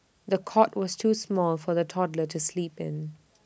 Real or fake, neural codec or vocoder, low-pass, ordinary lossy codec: real; none; none; none